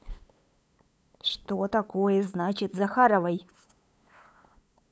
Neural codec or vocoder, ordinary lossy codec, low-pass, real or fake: codec, 16 kHz, 8 kbps, FunCodec, trained on LibriTTS, 25 frames a second; none; none; fake